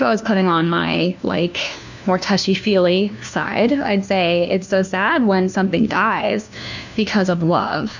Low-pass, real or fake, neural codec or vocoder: 7.2 kHz; fake; codec, 16 kHz, 1 kbps, FunCodec, trained on LibriTTS, 50 frames a second